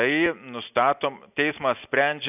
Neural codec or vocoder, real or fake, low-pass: none; real; 3.6 kHz